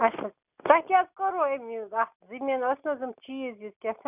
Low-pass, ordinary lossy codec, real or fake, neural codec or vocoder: 3.6 kHz; none; real; none